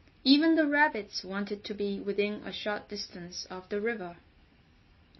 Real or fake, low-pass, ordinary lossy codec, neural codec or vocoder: real; 7.2 kHz; MP3, 24 kbps; none